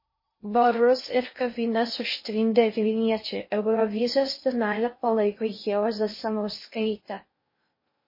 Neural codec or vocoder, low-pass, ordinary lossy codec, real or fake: codec, 16 kHz in and 24 kHz out, 0.6 kbps, FocalCodec, streaming, 2048 codes; 5.4 kHz; MP3, 24 kbps; fake